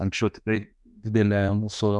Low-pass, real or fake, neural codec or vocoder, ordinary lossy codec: 10.8 kHz; fake; autoencoder, 48 kHz, 32 numbers a frame, DAC-VAE, trained on Japanese speech; MP3, 96 kbps